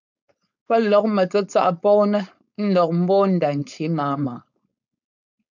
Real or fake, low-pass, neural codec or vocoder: fake; 7.2 kHz; codec, 16 kHz, 4.8 kbps, FACodec